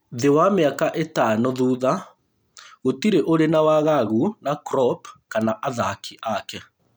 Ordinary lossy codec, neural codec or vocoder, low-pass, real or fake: none; none; none; real